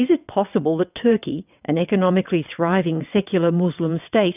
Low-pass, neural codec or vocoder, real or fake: 3.6 kHz; vocoder, 22.05 kHz, 80 mel bands, WaveNeXt; fake